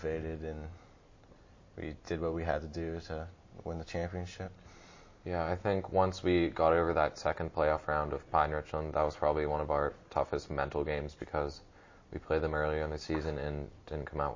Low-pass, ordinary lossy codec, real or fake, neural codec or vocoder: 7.2 kHz; MP3, 32 kbps; real; none